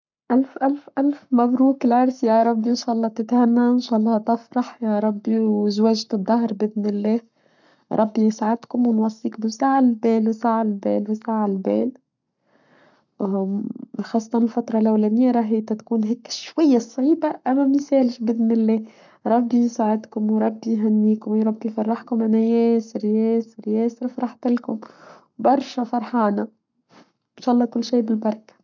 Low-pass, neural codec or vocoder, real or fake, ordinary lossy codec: 7.2 kHz; codec, 44.1 kHz, 7.8 kbps, Pupu-Codec; fake; none